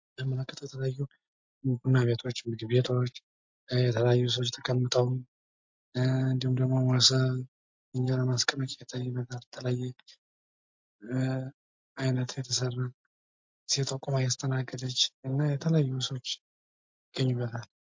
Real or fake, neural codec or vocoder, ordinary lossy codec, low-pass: real; none; MP3, 48 kbps; 7.2 kHz